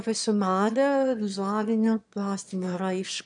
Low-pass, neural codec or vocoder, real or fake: 9.9 kHz; autoencoder, 22.05 kHz, a latent of 192 numbers a frame, VITS, trained on one speaker; fake